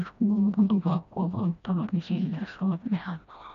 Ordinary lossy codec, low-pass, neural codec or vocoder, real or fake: none; 7.2 kHz; codec, 16 kHz, 1 kbps, FreqCodec, smaller model; fake